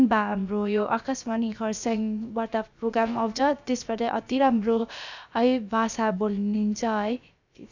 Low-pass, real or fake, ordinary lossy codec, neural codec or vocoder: 7.2 kHz; fake; none; codec, 16 kHz, about 1 kbps, DyCAST, with the encoder's durations